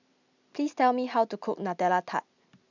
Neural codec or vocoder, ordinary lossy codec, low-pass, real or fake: none; none; 7.2 kHz; real